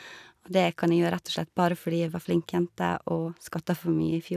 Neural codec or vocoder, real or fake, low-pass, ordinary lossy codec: none; real; 14.4 kHz; none